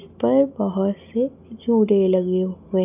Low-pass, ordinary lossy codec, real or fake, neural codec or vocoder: 3.6 kHz; none; real; none